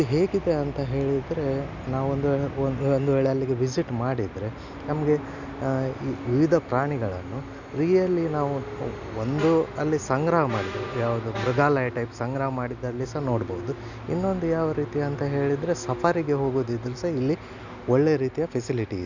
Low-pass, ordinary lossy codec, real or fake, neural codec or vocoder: 7.2 kHz; none; real; none